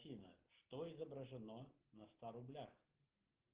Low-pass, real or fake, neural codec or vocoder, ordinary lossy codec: 3.6 kHz; real; none; Opus, 16 kbps